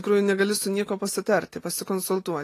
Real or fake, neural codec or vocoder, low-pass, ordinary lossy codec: real; none; 14.4 kHz; AAC, 48 kbps